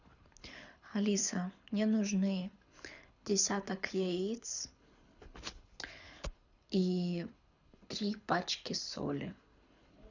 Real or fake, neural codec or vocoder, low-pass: fake; codec, 24 kHz, 6 kbps, HILCodec; 7.2 kHz